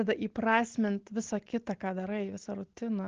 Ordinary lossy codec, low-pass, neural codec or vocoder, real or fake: Opus, 16 kbps; 7.2 kHz; none; real